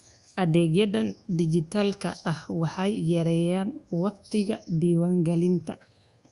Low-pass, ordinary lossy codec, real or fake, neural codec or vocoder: 10.8 kHz; Opus, 64 kbps; fake; codec, 24 kHz, 1.2 kbps, DualCodec